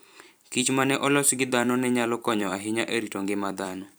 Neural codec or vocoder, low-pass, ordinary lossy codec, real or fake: vocoder, 44.1 kHz, 128 mel bands every 256 samples, BigVGAN v2; none; none; fake